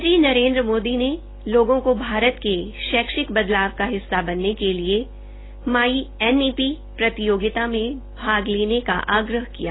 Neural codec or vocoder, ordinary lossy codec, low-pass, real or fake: none; AAC, 16 kbps; 7.2 kHz; real